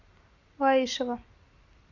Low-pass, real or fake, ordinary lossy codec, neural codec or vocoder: 7.2 kHz; real; MP3, 64 kbps; none